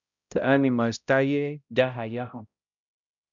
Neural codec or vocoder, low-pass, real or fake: codec, 16 kHz, 0.5 kbps, X-Codec, HuBERT features, trained on balanced general audio; 7.2 kHz; fake